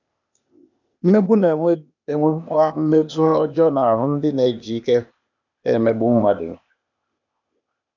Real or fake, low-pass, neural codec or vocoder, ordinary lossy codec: fake; 7.2 kHz; codec, 16 kHz, 0.8 kbps, ZipCodec; none